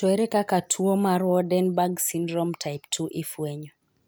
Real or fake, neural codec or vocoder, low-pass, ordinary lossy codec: real; none; none; none